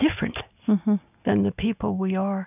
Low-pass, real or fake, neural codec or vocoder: 3.6 kHz; real; none